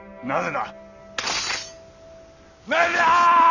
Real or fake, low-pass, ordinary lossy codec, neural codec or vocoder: real; 7.2 kHz; none; none